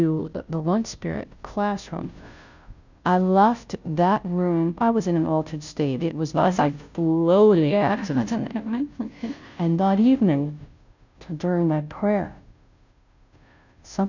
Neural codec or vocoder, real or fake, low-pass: codec, 16 kHz, 0.5 kbps, FunCodec, trained on Chinese and English, 25 frames a second; fake; 7.2 kHz